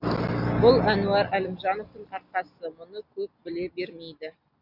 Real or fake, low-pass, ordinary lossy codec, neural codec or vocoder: real; 5.4 kHz; none; none